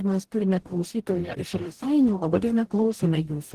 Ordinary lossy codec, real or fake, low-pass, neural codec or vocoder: Opus, 16 kbps; fake; 14.4 kHz; codec, 44.1 kHz, 0.9 kbps, DAC